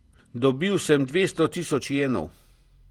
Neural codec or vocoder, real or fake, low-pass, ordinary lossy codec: vocoder, 48 kHz, 128 mel bands, Vocos; fake; 19.8 kHz; Opus, 24 kbps